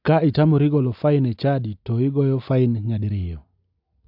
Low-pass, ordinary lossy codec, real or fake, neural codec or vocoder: 5.4 kHz; AAC, 48 kbps; real; none